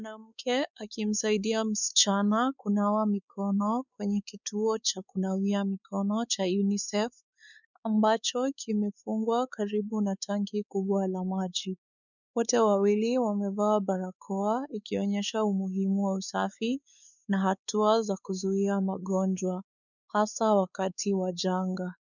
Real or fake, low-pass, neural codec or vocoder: fake; 7.2 kHz; codec, 16 kHz, 4 kbps, X-Codec, WavLM features, trained on Multilingual LibriSpeech